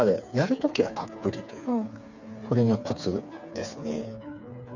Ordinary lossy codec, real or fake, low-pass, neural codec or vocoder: none; fake; 7.2 kHz; codec, 16 kHz, 4 kbps, FreqCodec, smaller model